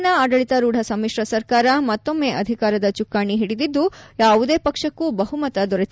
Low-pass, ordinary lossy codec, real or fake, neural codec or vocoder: none; none; real; none